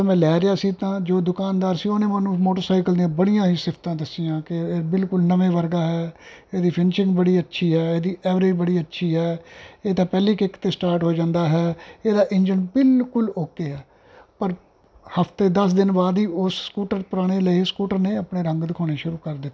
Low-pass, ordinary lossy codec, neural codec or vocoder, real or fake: none; none; none; real